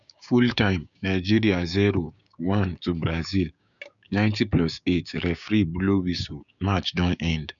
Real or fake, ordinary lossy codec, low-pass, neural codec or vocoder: fake; none; 7.2 kHz; codec, 16 kHz, 6 kbps, DAC